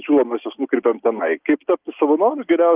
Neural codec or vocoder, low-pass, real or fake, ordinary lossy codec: none; 3.6 kHz; real; Opus, 32 kbps